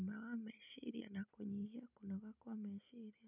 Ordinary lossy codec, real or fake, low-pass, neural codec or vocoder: none; real; 3.6 kHz; none